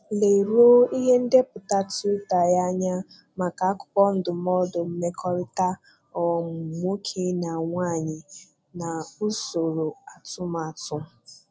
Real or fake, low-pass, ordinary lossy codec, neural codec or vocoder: real; none; none; none